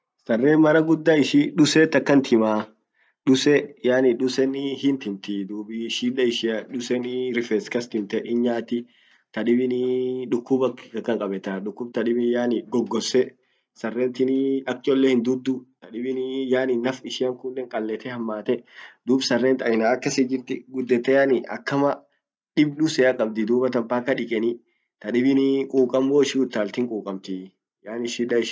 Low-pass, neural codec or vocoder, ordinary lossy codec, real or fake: none; none; none; real